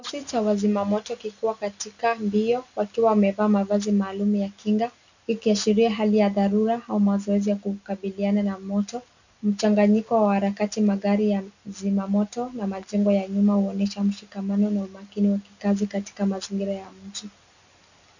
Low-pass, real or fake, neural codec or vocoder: 7.2 kHz; real; none